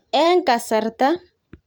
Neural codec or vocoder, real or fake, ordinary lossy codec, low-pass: vocoder, 44.1 kHz, 128 mel bands every 512 samples, BigVGAN v2; fake; none; none